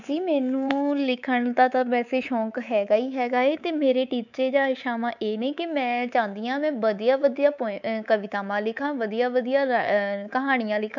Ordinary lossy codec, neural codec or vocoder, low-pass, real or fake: none; codec, 24 kHz, 3.1 kbps, DualCodec; 7.2 kHz; fake